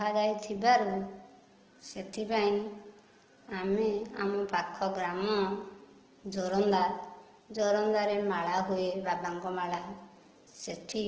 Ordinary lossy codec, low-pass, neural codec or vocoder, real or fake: Opus, 16 kbps; 7.2 kHz; none; real